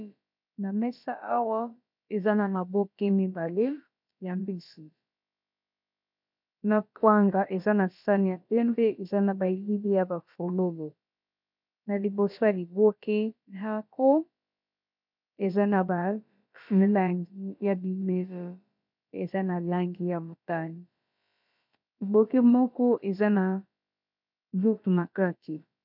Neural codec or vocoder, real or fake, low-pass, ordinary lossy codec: codec, 16 kHz, about 1 kbps, DyCAST, with the encoder's durations; fake; 5.4 kHz; MP3, 48 kbps